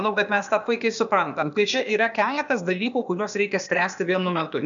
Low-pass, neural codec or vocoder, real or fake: 7.2 kHz; codec, 16 kHz, 0.8 kbps, ZipCodec; fake